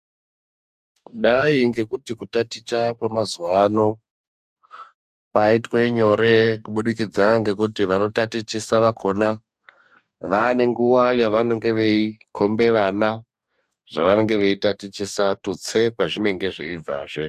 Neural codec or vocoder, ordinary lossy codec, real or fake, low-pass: codec, 44.1 kHz, 2.6 kbps, DAC; AAC, 96 kbps; fake; 14.4 kHz